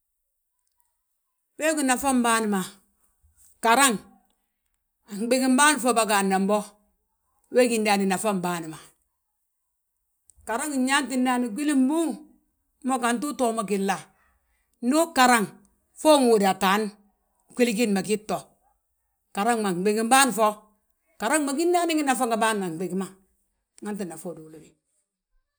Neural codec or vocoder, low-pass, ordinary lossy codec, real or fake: none; none; none; real